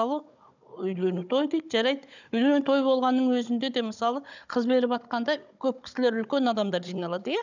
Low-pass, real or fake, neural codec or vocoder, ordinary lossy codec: 7.2 kHz; fake; codec, 16 kHz, 16 kbps, FunCodec, trained on Chinese and English, 50 frames a second; none